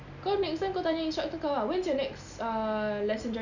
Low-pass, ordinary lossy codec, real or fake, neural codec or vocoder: 7.2 kHz; none; real; none